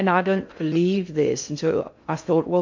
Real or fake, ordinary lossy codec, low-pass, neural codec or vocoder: fake; MP3, 48 kbps; 7.2 kHz; codec, 16 kHz in and 24 kHz out, 0.6 kbps, FocalCodec, streaming, 2048 codes